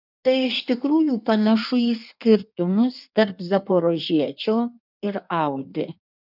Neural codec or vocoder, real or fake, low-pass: codec, 16 kHz in and 24 kHz out, 1.1 kbps, FireRedTTS-2 codec; fake; 5.4 kHz